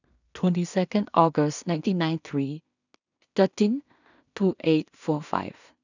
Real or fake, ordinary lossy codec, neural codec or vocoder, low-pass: fake; none; codec, 16 kHz in and 24 kHz out, 0.4 kbps, LongCat-Audio-Codec, two codebook decoder; 7.2 kHz